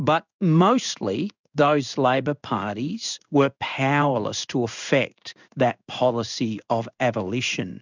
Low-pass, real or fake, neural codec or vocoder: 7.2 kHz; fake; codec, 16 kHz in and 24 kHz out, 1 kbps, XY-Tokenizer